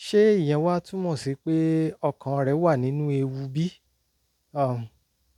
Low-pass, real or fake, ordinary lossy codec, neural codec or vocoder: 19.8 kHz; real; none; none